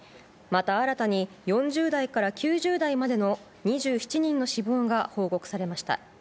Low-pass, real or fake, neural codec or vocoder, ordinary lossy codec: none; real; none; none